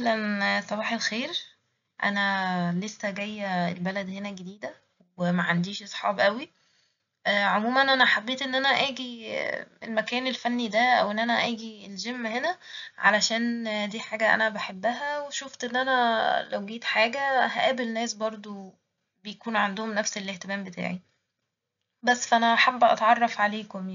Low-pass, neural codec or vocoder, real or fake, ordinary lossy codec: 7.2 kHz; none; real; MP3, 96 kbps